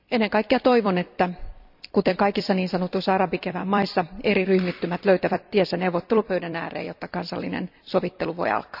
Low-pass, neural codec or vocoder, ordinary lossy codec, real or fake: 5.4 kHz; vocoder, 44.1 kHz, 128 mel bands every 256 samples, BigVGAN v2; none; fake